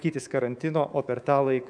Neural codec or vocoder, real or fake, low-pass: codec, 24 kHz, 3.1 kbps, DualCodec; fake; 9.9 kHz